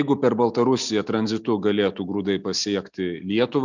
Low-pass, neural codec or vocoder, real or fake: 7.2 kHz; none; real